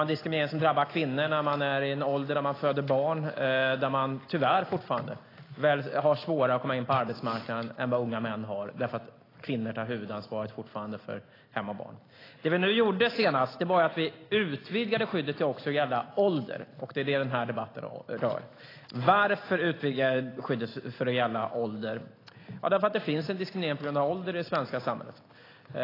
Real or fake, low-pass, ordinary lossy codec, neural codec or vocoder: real; 5.4 kHz; AAC, 24 kbps; none